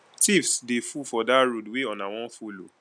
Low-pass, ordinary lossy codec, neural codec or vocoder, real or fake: 9.9 kHz; none; none; real